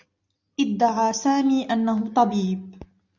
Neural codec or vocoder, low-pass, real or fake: none; 7.2 kHz; real